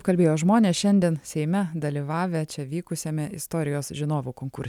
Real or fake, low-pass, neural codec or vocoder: real; 19.8 kHz; none